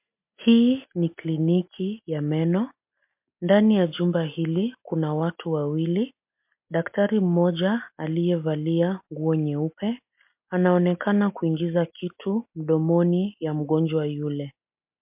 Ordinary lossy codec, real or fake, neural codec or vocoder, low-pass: MP3, 32 kbps; real; none; 3.6 kHz